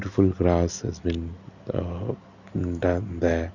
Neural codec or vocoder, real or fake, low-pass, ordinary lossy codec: none; real; 7.2 kHz; none